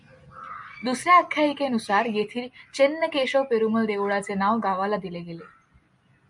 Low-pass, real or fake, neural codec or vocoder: 10.8 kHz; real; none